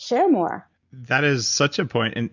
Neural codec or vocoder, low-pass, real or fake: none; 7.2 kHz; real